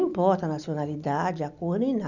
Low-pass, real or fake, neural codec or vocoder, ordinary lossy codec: 7.2 kHz; real; none; none